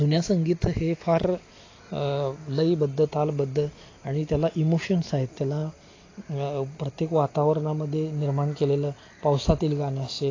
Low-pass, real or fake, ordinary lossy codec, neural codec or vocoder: 7.2 kHz; real; AAC, 32 kbps; none